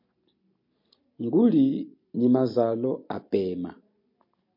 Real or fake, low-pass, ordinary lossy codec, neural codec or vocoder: real; 5.4 kHz; MP3, 32 kbps; none